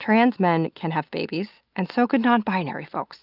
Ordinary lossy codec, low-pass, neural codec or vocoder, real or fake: Opus, 24 kbps; 5.4 kHz; none; real